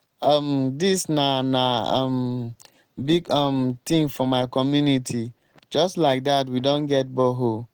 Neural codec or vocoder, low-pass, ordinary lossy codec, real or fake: none; 19.8 kHz; Opus, 16 kbps; real